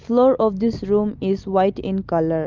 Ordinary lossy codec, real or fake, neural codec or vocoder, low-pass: Opus, 32 kbps; real; none; 7.2 kHz